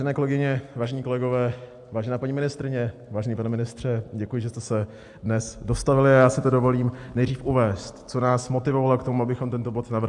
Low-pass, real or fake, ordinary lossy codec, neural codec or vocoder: 10.8 kHz; real; AAC, 64 kbps; none